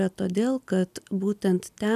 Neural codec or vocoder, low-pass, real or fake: none; 14.4 kHz; real